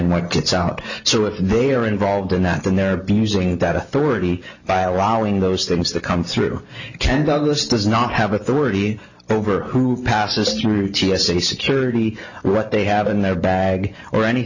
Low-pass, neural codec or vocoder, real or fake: 7.2 kHz; none; real